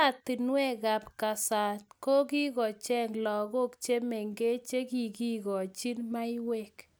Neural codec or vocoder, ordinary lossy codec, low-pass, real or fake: none; none; none; real